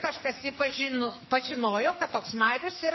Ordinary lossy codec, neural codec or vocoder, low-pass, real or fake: MP3, 24 kbps; codec, 44.1 kHz, 2.6 kbps, SNAC; 7.2 kHz; fake